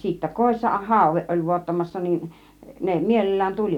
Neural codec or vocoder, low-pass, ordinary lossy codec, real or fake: none; 19.8 kHz; none; real